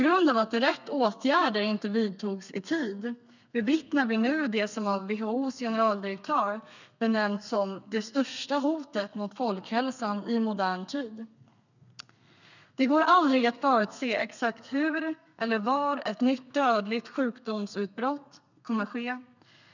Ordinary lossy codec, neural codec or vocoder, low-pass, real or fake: none; codec, 32 kHz, 1.9 kbps, SNAC; 7.2 kHz; fake